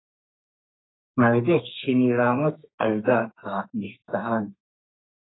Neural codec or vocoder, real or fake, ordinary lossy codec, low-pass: codec, 32 kHz, 1.9 kbps, SNAC; fake; AAC, 16 kbps; 7.2 kHz